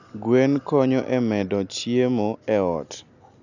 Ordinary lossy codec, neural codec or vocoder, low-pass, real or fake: none; none; 7.2 kHz; real